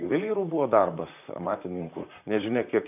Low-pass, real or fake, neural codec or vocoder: 3.6 kHz; fake; vocoder, 44.1 kHz, 128 mel bands, Pupu-Vocoder